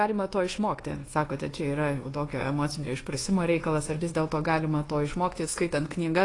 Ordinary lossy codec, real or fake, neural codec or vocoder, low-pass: AAC, 32 kbps; fake; codec, 24 kHz, 1.2 kbps, DualCodec; 10.8 kHz